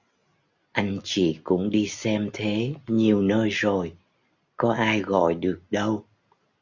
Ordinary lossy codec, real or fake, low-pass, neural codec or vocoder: Opus, 64 kbps; real; 7.2 kHz; none